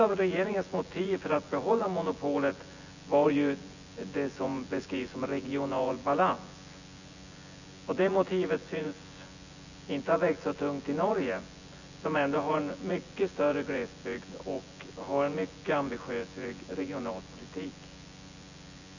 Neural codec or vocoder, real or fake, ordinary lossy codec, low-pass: vocoder, 24 kHz, 100 mel bands, Vocos; fake; none; 7.2 kHz